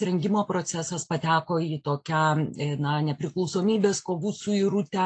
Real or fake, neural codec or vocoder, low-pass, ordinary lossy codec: real; none; 9.9 kHz; AAC, 48 kbps